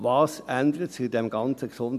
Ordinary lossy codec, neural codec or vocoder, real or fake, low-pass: none; none; real; 14.4 kHz